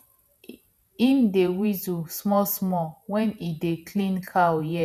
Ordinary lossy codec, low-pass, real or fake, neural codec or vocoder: none; 14.4 kHz; fake; vocoder, 48 kHz, 128 mel bands, Vocos